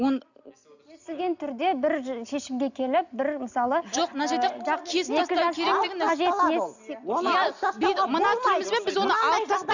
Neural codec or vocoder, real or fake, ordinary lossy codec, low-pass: none; real; none; 7.2 kHz